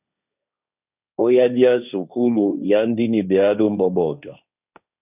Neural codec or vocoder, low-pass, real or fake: codec, 16 kHz, 1.1 kbps, Voila-Tokenizer; 3.6 kHz; fake